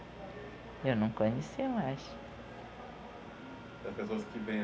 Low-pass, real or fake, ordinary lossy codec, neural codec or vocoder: none; real; none; none